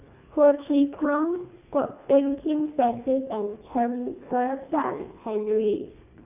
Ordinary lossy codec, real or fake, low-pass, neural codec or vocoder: none; fake; 3.6 kHz; codec, 24 kHz, 1.5 kbps, HILCodec